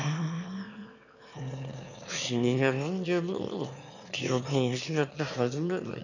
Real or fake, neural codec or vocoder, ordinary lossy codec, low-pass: fake; autoencoder, 22.05 kHz, a latent of 192 numbers a frame, VITS, trained on one speaker; none; 7.2 kHz